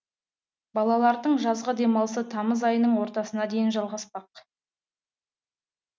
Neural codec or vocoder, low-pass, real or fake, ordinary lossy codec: none; none; real; none